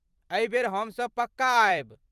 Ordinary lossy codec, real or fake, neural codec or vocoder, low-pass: Opus, 32 kbps; fake; vocoder, 44.1 kHz, 128 mel bands every 256 samples, BigVGAN v2; 14.4 kHz